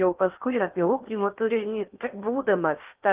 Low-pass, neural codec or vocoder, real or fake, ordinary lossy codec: 3.6 kHz; codec, 16 kHz in and 24 kHz out, 0.8 kbps, FocalCodec, streaming, 65536 codes; fake; Opus, 32 kbps